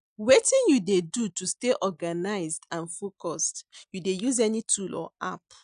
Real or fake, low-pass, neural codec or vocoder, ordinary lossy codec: real; 9.9 kHz; none; none